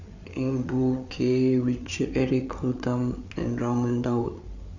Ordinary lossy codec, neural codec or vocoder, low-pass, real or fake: none; codec, 16 kHz, 8 kbps, FreqCodec, larger model; 7.2 kHz; fake